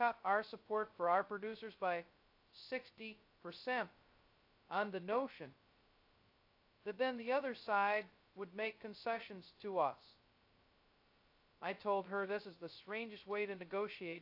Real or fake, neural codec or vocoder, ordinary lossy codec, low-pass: fake; codec, 16 kHz, 0.2 kbps, FocalCodec; AAC, 32 kbps; 5.4 kHz